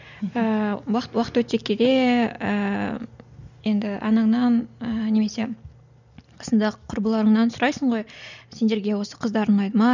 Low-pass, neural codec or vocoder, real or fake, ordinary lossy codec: 7.2 kHz; none; real; none